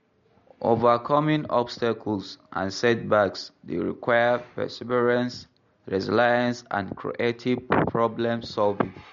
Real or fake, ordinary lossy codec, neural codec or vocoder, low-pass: real; MP3, 48 kbps; none; 7.2 kHz